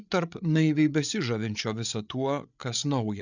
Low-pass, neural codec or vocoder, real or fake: 7.2 kHz; codec, 16 kHz, 8 kbps, FreqCodec, larger model; fake